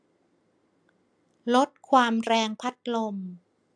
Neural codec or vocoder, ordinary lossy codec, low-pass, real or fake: none; AAC, 64 kbps; 9.9 kHz; real